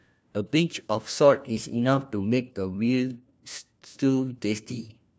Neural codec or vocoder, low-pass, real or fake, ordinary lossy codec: codec, 16 kHz, 1 kbps, FunCodec, trained on LibriTTS, 50 frames a second; none; fake; none